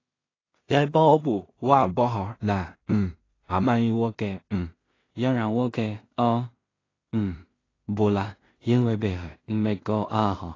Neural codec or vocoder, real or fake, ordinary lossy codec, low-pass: codec, 16 kHz in and 24 kHz out, 0.4 kbps, LongCat-Audio-Codec, two codebook decoder; fake; AAC, 32 kbps; 7.2 kHz